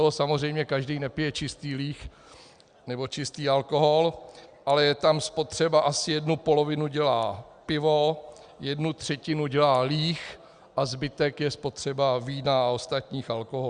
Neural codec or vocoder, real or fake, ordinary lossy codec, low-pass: none; real; Opus, 64 kbps; 9.9 kHz